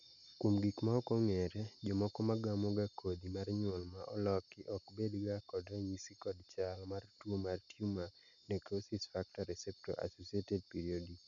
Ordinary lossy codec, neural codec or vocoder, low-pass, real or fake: none; none; 7.2 kHz; real